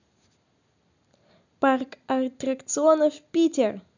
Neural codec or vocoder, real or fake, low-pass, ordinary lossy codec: none; real; 7.2 kHz; none